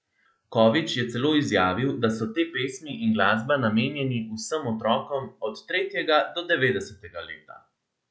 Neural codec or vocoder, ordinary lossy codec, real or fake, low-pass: none; none; real; none